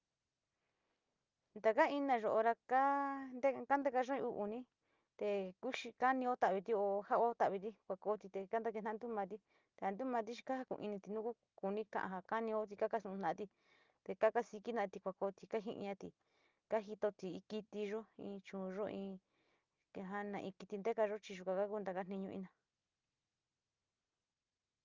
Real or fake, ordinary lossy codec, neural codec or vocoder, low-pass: real; Opus, 24 kbps; none; 7.2 kHz